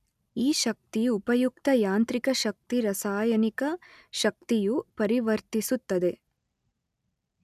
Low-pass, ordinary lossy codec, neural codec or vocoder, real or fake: 14.4 kHz; none; none; real